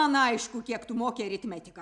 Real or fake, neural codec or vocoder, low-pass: real; none; 10.8 kHz